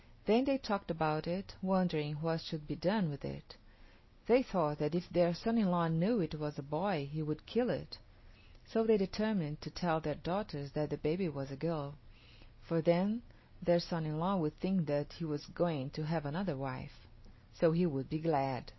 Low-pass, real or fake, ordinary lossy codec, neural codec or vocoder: 7.2 kHz; real; MP3, 24 kbps; none